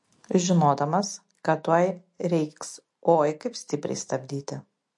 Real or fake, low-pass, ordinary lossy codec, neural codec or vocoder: real; 10.8 kHz; MP3, 48 kbps; none